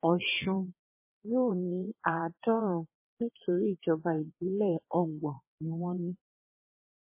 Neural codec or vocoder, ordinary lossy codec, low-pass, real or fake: vocoder, 22.05 kHz, 80 mel bands, WaveNeXt; MP3, 16 kbps; 3.6 kHz; fake